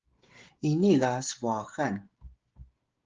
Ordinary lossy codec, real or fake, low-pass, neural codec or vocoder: Opus, 16 kbps; fake; 7.2 kHz; codec, 16 kHz, 16 kbps, FreqCodec, smaller model